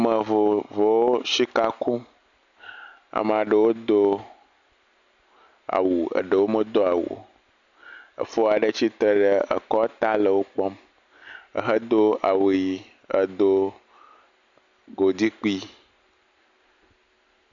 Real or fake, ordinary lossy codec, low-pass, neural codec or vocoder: real; AAC, 64 kbps; 7.2 kHz; none